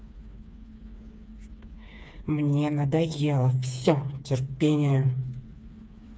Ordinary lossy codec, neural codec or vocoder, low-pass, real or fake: none; codec, 16 kHz, 4 kbps, FreqCodec, smaller model; none; fake